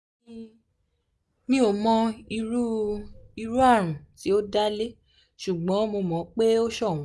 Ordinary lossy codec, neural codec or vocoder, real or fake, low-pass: none; none; real; none